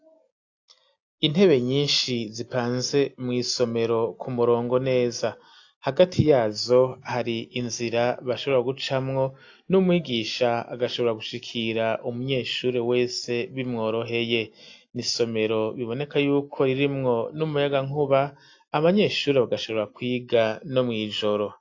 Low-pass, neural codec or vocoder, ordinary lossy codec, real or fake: 7.2 kHz; none; AAC, 48 kbps; real